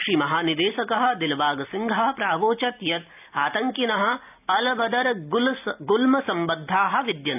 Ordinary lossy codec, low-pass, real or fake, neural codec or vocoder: none; 3.6 kHz; real; none